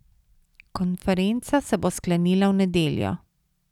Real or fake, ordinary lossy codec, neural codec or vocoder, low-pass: real; none; none; 19.8 kHz